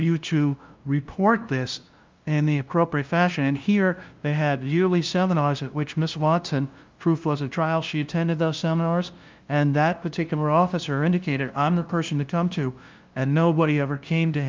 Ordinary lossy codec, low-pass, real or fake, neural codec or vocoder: Opus, 24 kbps; 7.2 kHz; fake; codec, 16 kHz, 0.5 kbps, FunCodec, trained on LibriTTS, 25 frames a second